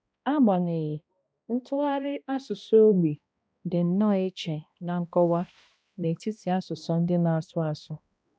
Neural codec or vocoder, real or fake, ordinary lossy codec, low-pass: codec, 16 kHz, 1 kbps, X-Codec, HuBERT features, trained on balanced general audio; fake; none; none